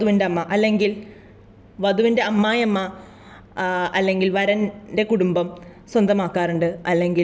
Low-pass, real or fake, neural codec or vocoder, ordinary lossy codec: none; real; none; none